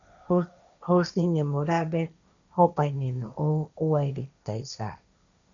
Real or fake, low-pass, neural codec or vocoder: fake; 7.2 kHz; codec, 16 kHz, 1.1 kbps, Voila-Tokenizer